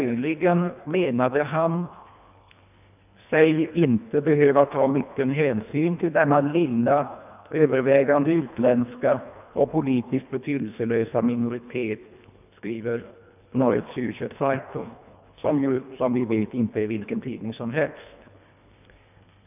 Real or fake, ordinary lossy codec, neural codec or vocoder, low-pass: fake; none; codec, 24 kHz, 1.5 kbps, HILCodec; 3.6 kHz